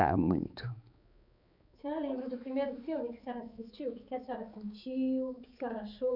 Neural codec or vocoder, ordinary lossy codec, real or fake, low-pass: codec, 16 kHz, 4 kbps, X-Codec, HuBERT features, trained on balanced general audio; none; fake; 5.4 kHz